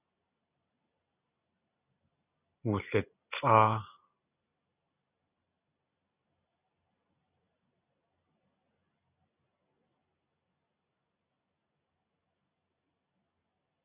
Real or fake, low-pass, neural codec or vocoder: real; 3.6 kHz; none